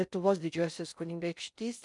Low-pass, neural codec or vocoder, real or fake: 10.8 kHz; codec, 16 kHz in and 24 kHz out, 0.6 kbps, FocalCodec, streaming, 4096 codes; fake